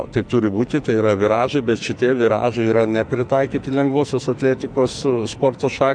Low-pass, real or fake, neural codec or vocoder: 9.9 kHz; fake; codec, 44.1 kHz, 2.6 kbps, SNAC